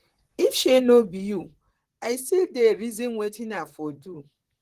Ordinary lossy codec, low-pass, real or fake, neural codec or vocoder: Opus, 16 kbps; 14.4 kHz; fake; vocoder, 44.1 kHz, 128 mel bands, Pupu-Vocoder